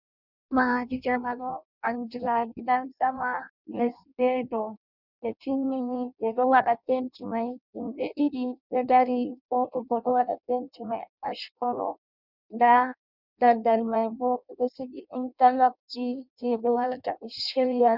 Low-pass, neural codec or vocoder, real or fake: 5.4 kHz; codec, 16 kHz in and 24 kHz out, 0.6 kbps, FireRedTTS-2 codec; fake